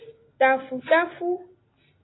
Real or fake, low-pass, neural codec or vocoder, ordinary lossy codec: real; 7.2 kHz; none; AAC, 16 kbps